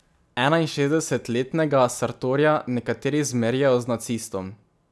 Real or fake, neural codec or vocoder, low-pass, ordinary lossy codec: real; none; none; none